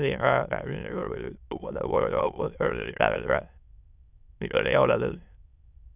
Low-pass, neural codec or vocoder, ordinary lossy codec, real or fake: 3.6 kHz; autoencoder, 22.05 kHz, a latent of 192 numbers a frame, VITS, trained on many speakers; none; fake